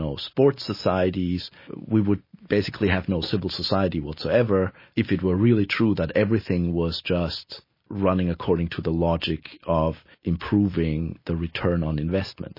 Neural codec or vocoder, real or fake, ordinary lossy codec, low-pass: none; real; MP3, 24 kbps; 5.4 kHz